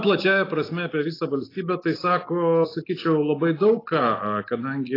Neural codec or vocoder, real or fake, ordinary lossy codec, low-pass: none; real; AAC, 24 kbps; 5.4 kHz